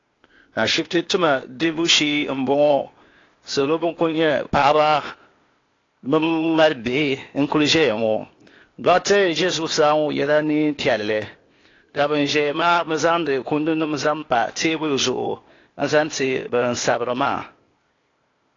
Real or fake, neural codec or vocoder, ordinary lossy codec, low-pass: fake; codec, 16 kHz, 0.8 kbps, ZipCodec; AAC, 32 kbps; 7.2 kHz